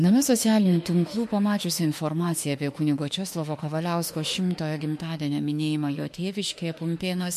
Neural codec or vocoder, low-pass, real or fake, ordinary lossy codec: autoencoder, 48 kHz, 32 numbers a frame, DAC-VAE, trained on Japanese speech; 14.4 kHz; fake; MP3, 64 kbps